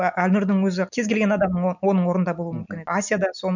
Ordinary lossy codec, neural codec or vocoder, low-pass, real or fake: none; none; 7.2 kHz; real